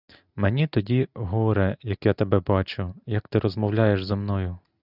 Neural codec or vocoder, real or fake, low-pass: none; real; 5.4 kHz